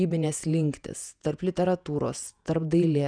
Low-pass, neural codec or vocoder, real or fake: 9.9 kHz; vocoder, 48 kHz, 128 mel bands, Vocos; fake